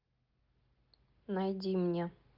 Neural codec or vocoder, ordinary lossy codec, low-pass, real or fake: none; Opus, 24 kbps; 5.4 kHz; real